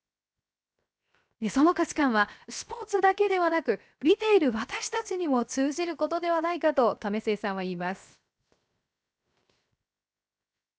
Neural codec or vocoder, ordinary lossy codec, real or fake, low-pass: codec, 16 kHz, 0.7 kbps, FocalCodec; none; fake; none